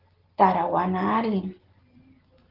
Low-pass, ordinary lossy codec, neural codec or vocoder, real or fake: 5.4 kHz; Opus, 16 kbps; none; real